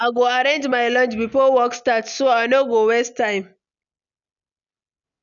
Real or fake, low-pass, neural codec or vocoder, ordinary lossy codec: real; 7.2 kHz; none; none